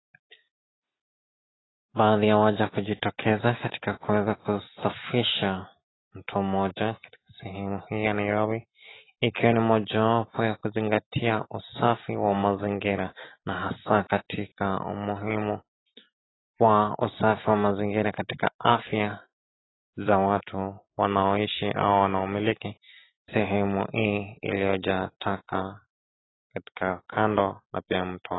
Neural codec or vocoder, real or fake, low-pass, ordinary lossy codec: none; real; 7.2 kHz; AAC, 16 kbps